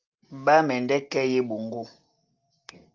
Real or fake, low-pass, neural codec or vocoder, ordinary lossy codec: real; 7.2 kHz; none; Opus, 24 kbps